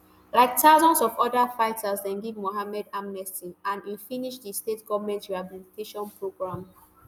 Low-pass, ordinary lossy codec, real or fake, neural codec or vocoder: none; none; real; none